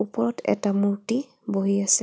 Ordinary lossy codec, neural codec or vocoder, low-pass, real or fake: none; none; none; real